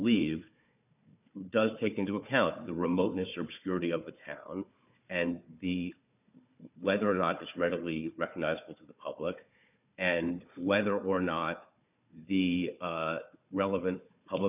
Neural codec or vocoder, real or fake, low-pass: codec, 16 kHz, 4 kbps, FunCodec, trained on Chinese and English, 50 frames a second; fake; 3.6 kHz